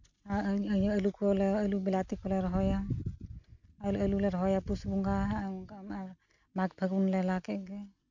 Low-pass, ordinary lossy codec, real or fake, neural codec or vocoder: 7.2 kHz; none; real; none